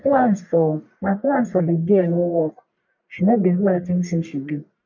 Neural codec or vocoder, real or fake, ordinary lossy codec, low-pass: codec, 44.1 kHz, 1.7 kbps, Pupu-Codec; fake; MP3, 48 kbps; 7.2 kHz